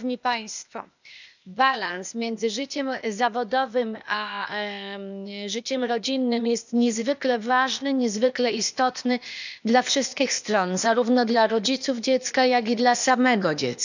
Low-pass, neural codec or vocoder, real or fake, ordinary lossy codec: 7.2 kHz; codec, 16 kHz, 0.8 kbps, ZipCodec; fake; none